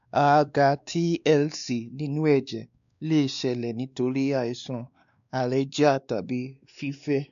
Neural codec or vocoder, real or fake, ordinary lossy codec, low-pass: codec, 16 kHz, 2 kbps, X-Codec, WavLM features, trained on Multilingual LibriSpeech; fake; none; 7.2 kHz